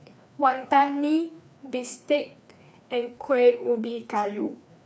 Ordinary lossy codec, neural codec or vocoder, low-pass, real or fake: none; codec, 16 kHz, 2 kbps, FreqCodec, larger model; none; fake